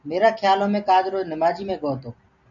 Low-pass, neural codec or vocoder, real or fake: 7.2 kHz; none; real